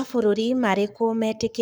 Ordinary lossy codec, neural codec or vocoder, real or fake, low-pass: none; codec, 44.1 kHz, 7.8 kbps, Pupu-Codec; fake; none